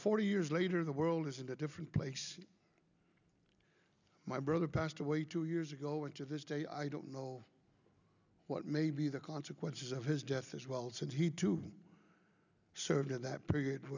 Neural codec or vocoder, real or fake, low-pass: none; real; 7.2 kHz